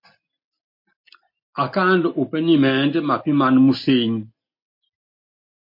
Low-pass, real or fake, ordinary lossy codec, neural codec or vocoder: 5.4 kHz; real; MP3, 32 kbps; none